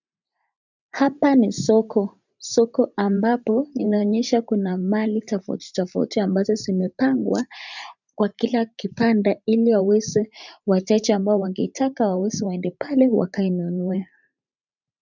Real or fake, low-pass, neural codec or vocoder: fake; 7.2 kHz; vocoder, 44.1 kHz, 128 mel bands every 512 samples, BigVGAN v2